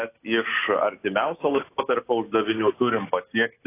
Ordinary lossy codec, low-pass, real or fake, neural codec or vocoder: AAC, 24 kbps; 3.6 kHz; real; none